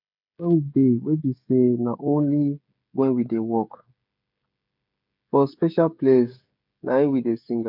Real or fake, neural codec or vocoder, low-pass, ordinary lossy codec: fake; codec, 16 kHz, 16 kbps, FreqCodec, smaller model; 5.4 kHz; none